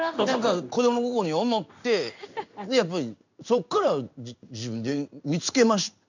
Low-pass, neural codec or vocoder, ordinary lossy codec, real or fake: 7.2 kHz; codec, 16 kHz in and 24 kHz out, 1 kbps, XY-Tokenizer; none; fake